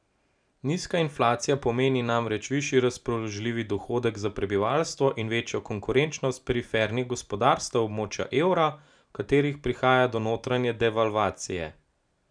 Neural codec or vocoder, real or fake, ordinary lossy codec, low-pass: none; real; none; 9.9 kHz